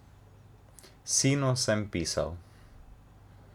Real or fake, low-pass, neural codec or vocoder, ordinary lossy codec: real; 19.8 kHz; none; none